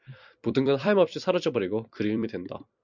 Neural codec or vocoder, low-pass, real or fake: vocoder, 44.1 kHz, 128 mel bands every 256 samples, BigVGAN v2; 7.2 kHz; fake